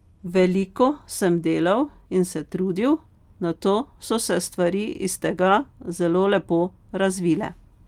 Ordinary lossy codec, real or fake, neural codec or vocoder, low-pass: Opus, 32 kbps; real; none; 19.8 kHz